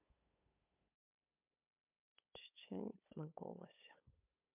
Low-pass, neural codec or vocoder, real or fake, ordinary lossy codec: 3.6 kHz; codec, 16 kHz, 8 kbps, FunCodec, trained on LibriTTS, 25 frames a second; fake; MP3, 32 kbps